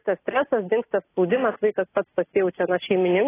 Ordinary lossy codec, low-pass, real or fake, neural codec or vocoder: AAC, 16 kbps; 3.6 kHz; real; none